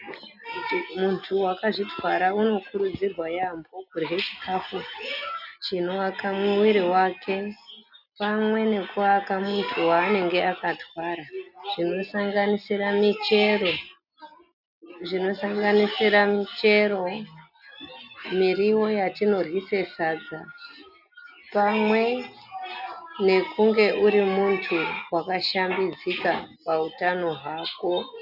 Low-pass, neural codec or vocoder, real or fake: 5.4 kHz; none; real